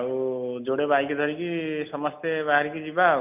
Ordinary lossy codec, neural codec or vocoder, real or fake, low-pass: none; none; real; 3.6 kHz